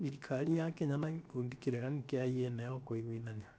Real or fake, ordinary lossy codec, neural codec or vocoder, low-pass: fake; none; codec, 16 kHz, about 1 kbps, DyCAST, with the encoder's durations; none